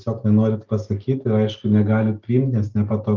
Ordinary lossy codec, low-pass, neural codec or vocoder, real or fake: Opus, 32 kbps; 7.2 kHz; none; real